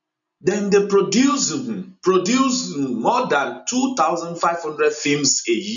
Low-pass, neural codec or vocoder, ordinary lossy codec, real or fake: 7.2 kHz; none; none; real